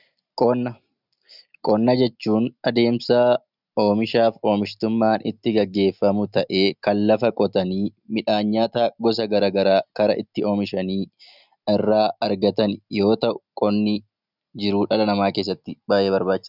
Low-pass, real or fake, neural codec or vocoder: 5.4 kHz; real; none